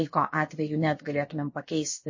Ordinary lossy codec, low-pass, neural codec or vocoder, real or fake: MP3, 32 kbps; 7.2 kHz; codec, 16 kHz, about 1 kbps, DyCAST, with the encoder's durations; fake